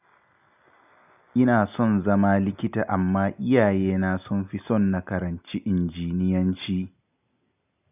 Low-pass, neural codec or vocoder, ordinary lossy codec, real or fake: 3.6 kHz; none; none; real